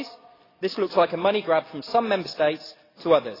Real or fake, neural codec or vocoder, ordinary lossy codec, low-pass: real; none; AAC, 24 kbps; 5.4 kHz